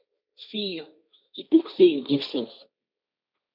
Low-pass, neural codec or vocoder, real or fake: 5.4 kHz; codec, 16 kHz, 1.1 kbps, Voila-Tokenizer; fake